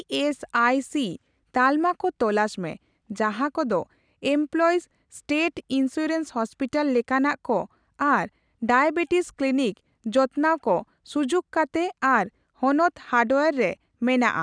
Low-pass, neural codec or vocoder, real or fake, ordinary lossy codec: 9.9 kHz; none; real; none